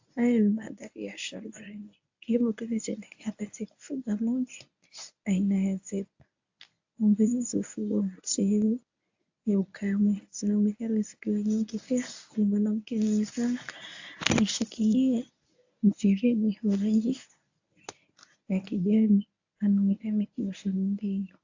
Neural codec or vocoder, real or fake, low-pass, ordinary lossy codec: codec, 24 kHz, 0.9 kbps, WavTokenizer, medium speech release version 1; fake; 7.2 kHz; AAC, 48 kbps